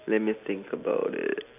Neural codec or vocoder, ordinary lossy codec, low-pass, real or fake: none; none; 3.6 kHz; real